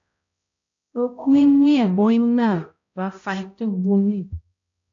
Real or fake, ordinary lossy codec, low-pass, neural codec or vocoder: fake; AAC, 64 kbps; 7.2 kHz; codec, 16 kHz, 0.5 kbps, X-Codec, HuBERT features, trained on balanced general audio